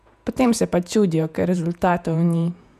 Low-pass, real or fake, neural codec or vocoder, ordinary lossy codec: 14.4 kHz; fake; vocoder, 48 kHz, 128 mel bands, Vocos; none